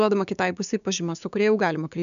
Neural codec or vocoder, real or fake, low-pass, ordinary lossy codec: codec, 16 kHz, 6 kbps, DAC; fake; 7.2 kHz; AAC, 96 kbps